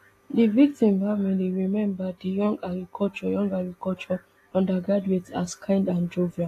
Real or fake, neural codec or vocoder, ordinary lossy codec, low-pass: real; none; AAC, 48 kbps; 14.4 kHz